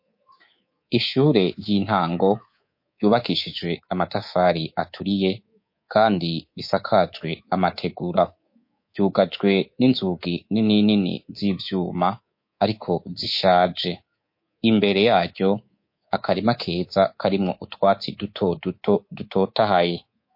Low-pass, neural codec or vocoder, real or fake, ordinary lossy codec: 5.4 kHz; codec, 24 kHz, 3.1 kbps, DualCodec; fake; MP3, 32 kbps